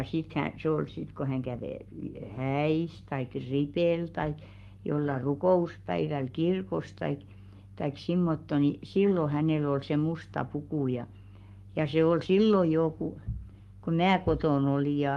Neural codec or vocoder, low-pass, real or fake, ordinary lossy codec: codec, 44.1 kHz, 7.8 kbps, Pupu-Codec; 19.8 kHz; fake; Opus, 32 kbps